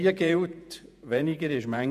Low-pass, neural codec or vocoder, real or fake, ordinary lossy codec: 14.4 kHz; vocoder, 48 kHz, 128 mel bands, Vocos; fake; none